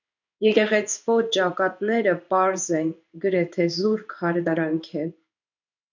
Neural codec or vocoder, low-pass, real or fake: codec, 16 kHz in and 24 kHz out, 1 kbps, XY-Tokenizer; 7.2 kHz; fake